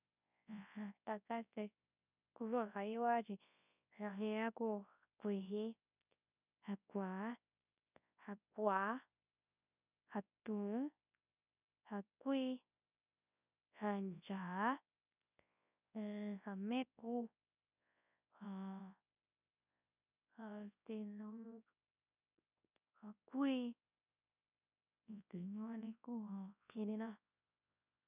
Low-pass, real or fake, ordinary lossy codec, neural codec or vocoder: 3.6 kHz; fake; none; codec, 24 kHz, 0.9 kbps, WavTokenizer, large speech release